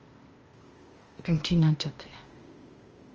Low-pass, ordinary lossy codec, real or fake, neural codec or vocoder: 7.2 kHz; Opus, 24 kbps; fake; codec, 16 kHz, 0.8 kbps, ZipCodec